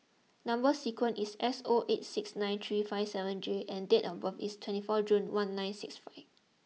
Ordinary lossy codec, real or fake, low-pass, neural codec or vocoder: none; real; none; none